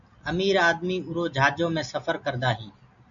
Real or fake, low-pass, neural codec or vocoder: real; 7.2 kHz; none